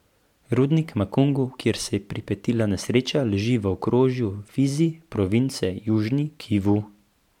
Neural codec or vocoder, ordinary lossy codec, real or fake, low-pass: vocoder, 44.1 kHz, 128 mel bands every 512 samples, BigVGAN v2; MP3, 96 kbps; fake; 19.8 kHz